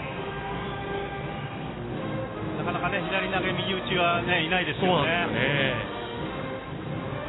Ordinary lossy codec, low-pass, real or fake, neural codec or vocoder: AAC, 16 kbps; 7.2 kHz; real; none